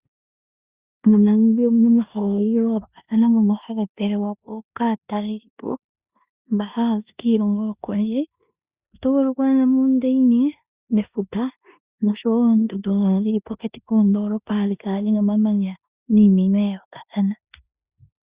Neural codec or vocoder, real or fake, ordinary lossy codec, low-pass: codec, 16 kHz in and 24 kHz out, 0.9 kbps, LongCat-Audio-Codec, four codebook decoder; fake; Opus, 64 kbps; 3.6 kHz